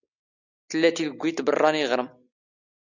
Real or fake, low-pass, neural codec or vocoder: real; 7.2 kHz; none